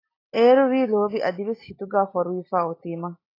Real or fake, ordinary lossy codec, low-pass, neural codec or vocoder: real; AAC, 24 kbps; 5.4 kHz; none